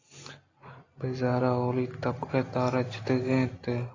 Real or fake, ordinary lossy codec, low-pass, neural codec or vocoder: real; AAC, 32 kbps; 7.2 kHz; none